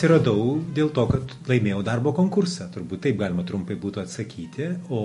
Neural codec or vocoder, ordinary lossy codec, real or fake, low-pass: none; MP3, 48 kbps; real; 14.4 kHz